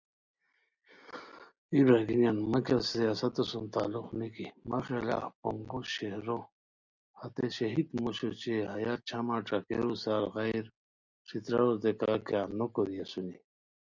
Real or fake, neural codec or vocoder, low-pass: real; none; 7.2 kHz